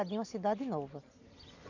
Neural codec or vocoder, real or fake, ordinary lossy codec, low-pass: none; real; none; 7.2 kHz